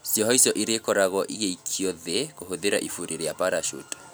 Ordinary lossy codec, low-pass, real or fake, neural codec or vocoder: none; none; real; none